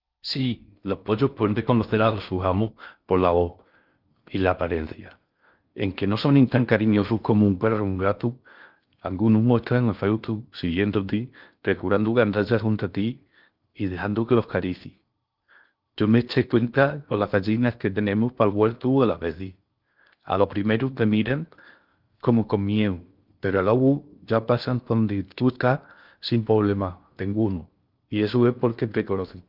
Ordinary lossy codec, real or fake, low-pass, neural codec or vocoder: Opus, 24 kbps; fake; 5.4 kHz; codec, 16 kHz in and 24 kHz out, 0.6 kbps, FocalCodec, streaming, 4096 codes